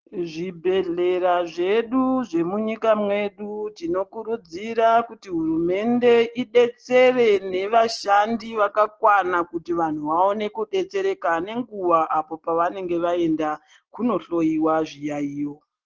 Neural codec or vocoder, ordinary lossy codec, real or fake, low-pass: none; Opus, 16 kbps; real; 7.2 kHz